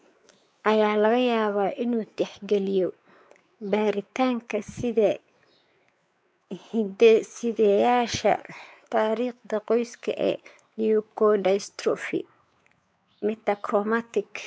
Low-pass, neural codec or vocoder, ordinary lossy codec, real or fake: none; codec, 16 kHz, 4 kbps, X-Codec, WavLM features, trained on Multilingual LibriSpeech; none; fake